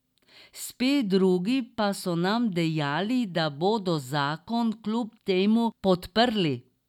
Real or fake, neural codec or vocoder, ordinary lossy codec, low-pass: real; none; none; 19.8 kHz